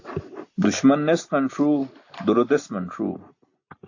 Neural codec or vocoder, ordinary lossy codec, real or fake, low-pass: none; AAC, 48 kbps; real; 7.2 kHz